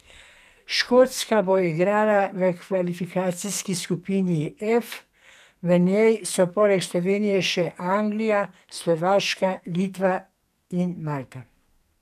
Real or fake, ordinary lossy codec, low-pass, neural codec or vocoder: fake; none; 14.4 kHz; codec, 44.1 kHz, 2.6 kbps, SNAC